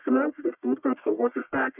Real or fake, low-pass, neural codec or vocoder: fake; 3.6 kHz; codec, 44.1 kHz, 1.7 kbps, Pupu-Codec